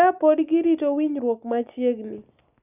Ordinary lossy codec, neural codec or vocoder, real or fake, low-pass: none; none; real; 3.6 kHz